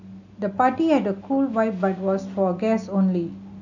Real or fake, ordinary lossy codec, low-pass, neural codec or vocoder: real; none; 7.2 kHz; none